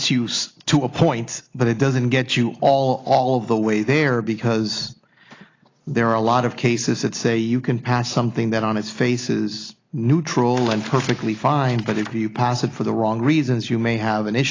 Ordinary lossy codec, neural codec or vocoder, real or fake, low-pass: AAC, 32 kbps; none; real; 7.2 kHz